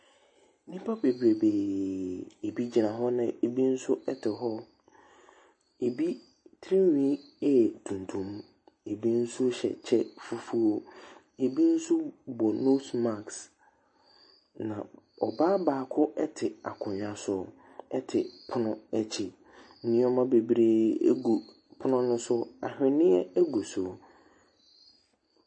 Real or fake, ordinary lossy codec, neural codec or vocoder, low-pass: real; MP3, 32 kbps; none; 9.9 kHz